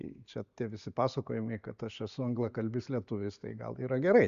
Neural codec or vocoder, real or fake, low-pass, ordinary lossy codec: none; real; 7.2 kHz; Opus, 64 kbps